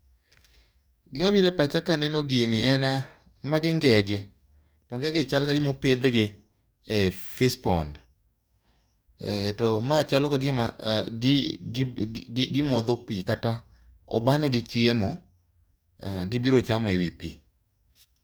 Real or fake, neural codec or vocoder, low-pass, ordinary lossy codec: fake; codec, 44.1 kHz, 2.6 kbps, DAC; none; none